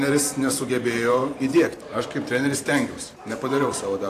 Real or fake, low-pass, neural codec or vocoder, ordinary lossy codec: fake; 14.4 kHz; vocoder, 44.1 kHz, 128 mel bands every 512 samples, BigVGAN v2; AAC, 64 kbps